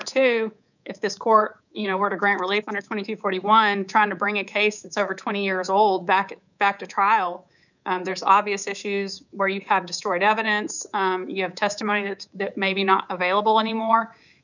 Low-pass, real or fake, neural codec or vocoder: 7.2 kHz; fake; codec, 24 kHz, 3.1 kbps, DualCodec